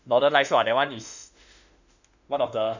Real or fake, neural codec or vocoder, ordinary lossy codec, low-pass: fake; autoencoder, 48 kHz, 32 numbers a frame, DAC-VAE, trained on Japanese speech; none; 7.2 kHz